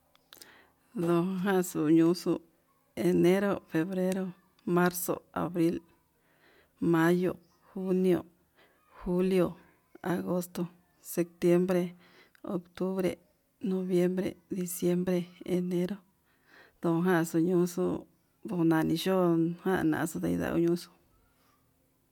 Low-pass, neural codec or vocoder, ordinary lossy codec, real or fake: 19.8 kHz; none; MP3, 96 kbps; real